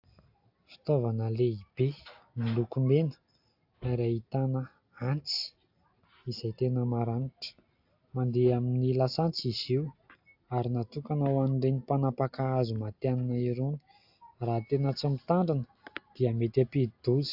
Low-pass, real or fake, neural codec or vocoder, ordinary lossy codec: 5.4 kHz; real; none; AAC, 48 kbps